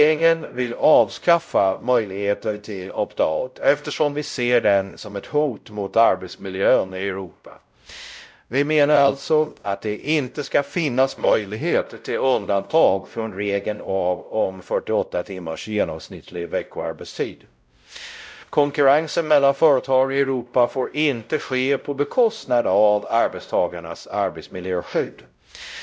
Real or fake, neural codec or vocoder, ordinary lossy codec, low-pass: fake; codec, 16 kHz, 0.5 kbps, X-Codec, WavLM features, trained on Multilingual LibriSpeech; none; none